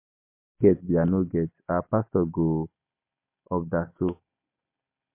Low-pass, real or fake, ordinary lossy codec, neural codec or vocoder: 3.6 kHz; real; MP3, 24 kbps; none